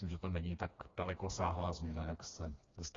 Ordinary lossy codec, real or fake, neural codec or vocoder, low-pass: AAC, 32 kbps; fake; codec, 16 kHz, 2 kbps, FreqCodec, smaller model; 7.2 kHz